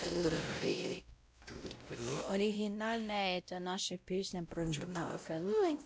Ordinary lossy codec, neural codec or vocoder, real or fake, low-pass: none; codec, 16 kHz, 0.5 kbps, X-Codec, WavLM features, trained on Multilingual LibriSpeech; fake; none